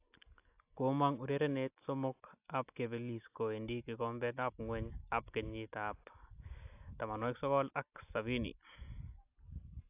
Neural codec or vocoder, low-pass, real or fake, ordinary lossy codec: none; 3.6 kHz; real; AAC, 32 kbps